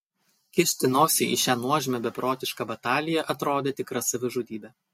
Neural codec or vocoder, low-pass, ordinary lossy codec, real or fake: none; 19.8 kHz; MP3, 64 kbps; real